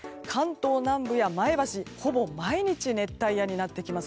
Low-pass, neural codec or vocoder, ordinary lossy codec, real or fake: none; none; none; real